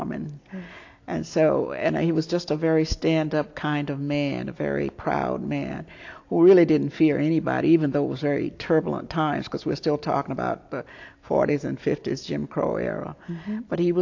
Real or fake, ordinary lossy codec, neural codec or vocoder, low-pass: real; AAC, 48 kbps; none; 7.2 kHz